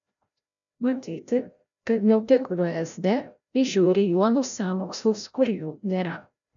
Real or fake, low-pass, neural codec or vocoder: fake; 7.2 kHz; codec, 16 kHz, 0.5 kbps, FreqCodec, larger model